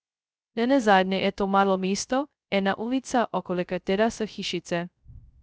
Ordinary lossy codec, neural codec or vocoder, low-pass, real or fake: none; codec, 16 kHz, 0.2 kbps, FocalCodec; none; fake